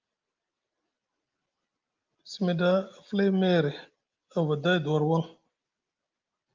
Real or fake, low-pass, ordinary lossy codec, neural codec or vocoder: real; 7.2 kHz; Opus, 24 kbps; none